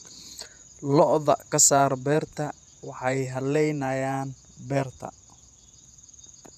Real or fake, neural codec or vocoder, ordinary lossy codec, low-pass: fake; vocoder, 48 kHz, 128 mel bands, Vocos; none; 14.4 kHz